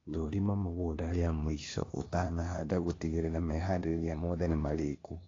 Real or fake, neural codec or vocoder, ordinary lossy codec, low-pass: fake; codec, 16 kHz, 0.8 kbps, ZipCodec; AAC, 32 kbps; 7.2 kHz